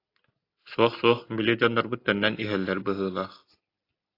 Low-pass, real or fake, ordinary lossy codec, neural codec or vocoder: 5.4 kHz; real; AAC, 32 kbps; none